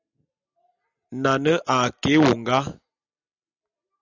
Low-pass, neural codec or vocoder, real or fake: 7.2 kHz; none; real